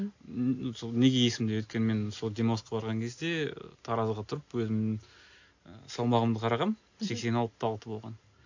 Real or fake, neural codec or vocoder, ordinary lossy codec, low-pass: real; none; AAC, 48 kbps; 7.2 kHz